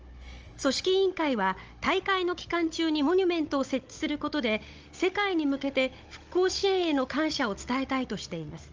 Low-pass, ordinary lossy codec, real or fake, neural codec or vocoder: 7.2 kHz; Opus, 24 kbps; fake; codec, 16 kHz, 16 kbps, FunCodec, trained on Chinese and English, 50 frames a second